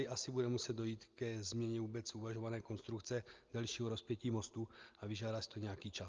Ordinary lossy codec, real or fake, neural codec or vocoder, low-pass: Opus, 24 kbps; real; none; 7.2 kHz